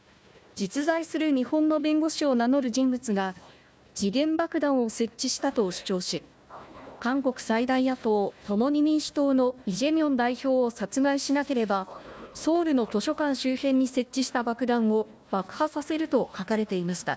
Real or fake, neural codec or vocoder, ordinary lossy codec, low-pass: fake; codec, 16 kHz, 1 kbps, FunCodec, trained on Chinese and English, 50 frames a second; none; none